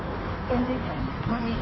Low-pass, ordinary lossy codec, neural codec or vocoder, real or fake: 7.2 kHz; MP3, 24 kbps; codec, 16 kHz in and 24 kHz out, 1.1 kbps, FireRedTTS-2 codec; fake